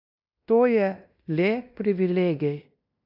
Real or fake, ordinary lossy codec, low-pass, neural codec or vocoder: fake; AAC, 48 kbps; 5.4 kHz; codec, 16 kHz in and 24 kHz out, 0.9 kbps, LongCat-Audio-Codec, fine tuned four codebook decoder